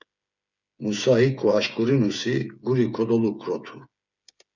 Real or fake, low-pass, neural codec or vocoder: fake; 7.2 kHz; codec, 16 kHz, 8 kbps, FreqCodec, smaller model